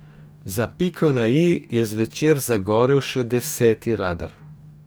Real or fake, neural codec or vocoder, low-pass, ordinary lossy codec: fake; codec, 44.1 kHz, 2.6 kbps, DAC; none; none